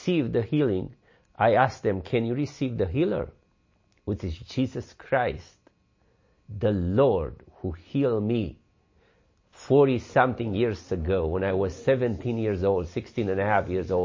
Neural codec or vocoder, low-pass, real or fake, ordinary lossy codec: none; 7.2 kHz; real; MP3, 32 kbps